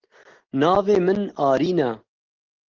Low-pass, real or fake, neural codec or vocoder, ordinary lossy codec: 7.2 kHz; real; none; Opus, 16 kbps